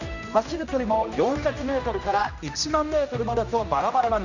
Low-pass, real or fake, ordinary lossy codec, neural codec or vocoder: 7.2 kHz; fake; none; codec, 16 kHz, 1 kbps, X-Codec, HuBERT features, trained on general audio